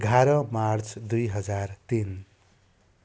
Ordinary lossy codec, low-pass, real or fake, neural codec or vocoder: none; none; real; none